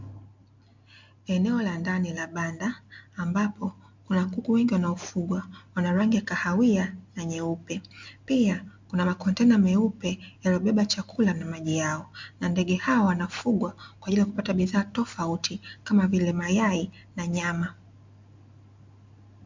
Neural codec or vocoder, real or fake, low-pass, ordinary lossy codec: none; real; 7.2 kHz; MP3, 64 kbps